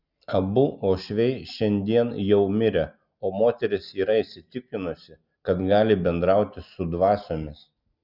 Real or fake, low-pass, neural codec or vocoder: real; 5.4 kHz; none